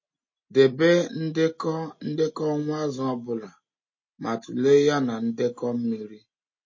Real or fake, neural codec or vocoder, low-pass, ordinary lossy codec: real; none; 7.2 kHz; MP3, 32 kbps